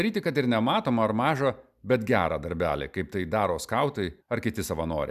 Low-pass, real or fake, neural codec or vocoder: 14.4 kHz; real; none